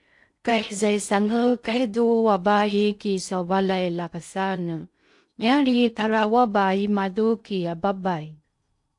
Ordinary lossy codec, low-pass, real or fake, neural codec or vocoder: AAC, 64 kbps; 10.8 kHz; fake; codec, 16 kHz in and 24 kHz out, 0.6 kbps, FocalCodec, streaming, 4096 codes